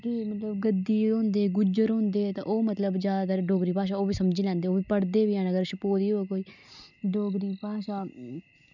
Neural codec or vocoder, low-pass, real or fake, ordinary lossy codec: none; 7.2 kHz; real; none